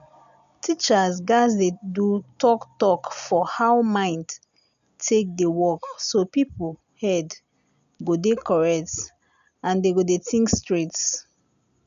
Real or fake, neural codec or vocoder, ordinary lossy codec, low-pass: real; none; none; 7.2 kHz